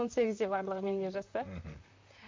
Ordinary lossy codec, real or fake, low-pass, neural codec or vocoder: MP3, 48 kbps; fake; 7.2 kHz; vocoder, 44.1 kHz, 128 mel bands, Pupu-Vocoder